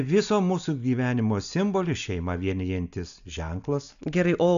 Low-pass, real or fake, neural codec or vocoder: 7.2 kHz; real; none